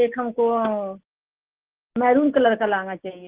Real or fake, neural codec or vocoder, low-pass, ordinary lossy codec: real; none; 3.6 kHz; Opus, 16 kbps